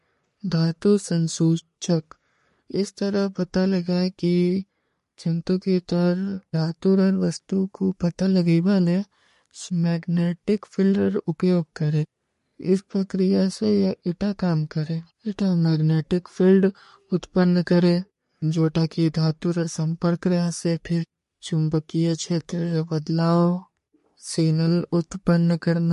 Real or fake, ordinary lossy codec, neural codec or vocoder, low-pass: fake; MP3, 48 kbps; codec, 44.1 kHz, 3.4 kbps, Pupu-Codec; 14.4 kHz